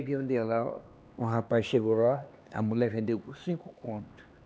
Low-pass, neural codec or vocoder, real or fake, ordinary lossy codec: none; codec, 16 kHz, 2 kbps, X-Codec, HuBERT features, trained on LibriSpeech; fake; none